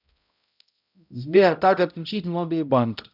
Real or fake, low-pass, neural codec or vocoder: fake; 5.4 kHz; codec, 16 kHz, 0.5 kbps, X-Codec, HuBERT features, trained on balanced general audio